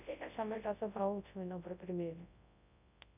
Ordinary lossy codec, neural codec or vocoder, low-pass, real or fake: none; codec, 24 kHz, 0.9 kbps, WavTokenizer, large speech release; 3.6 kHz; fake